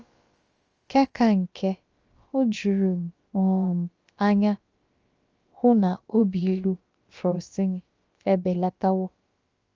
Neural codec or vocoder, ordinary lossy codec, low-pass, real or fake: codec, 16 kHz, about 1 kbps, DyCAST, with the encoder's durations; Opus, 24 kbps; 7.2 kHz; fake